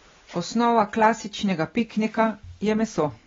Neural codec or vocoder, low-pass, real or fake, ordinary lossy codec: none; 7.2 kHz; real; AAC, 24 kbps